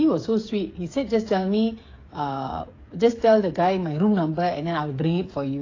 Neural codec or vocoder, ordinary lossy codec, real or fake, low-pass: codec, 16 kHz, 8 kbps, FreqCodec, smaller model; AAC, 32 kbps; fake; 7.2 kHz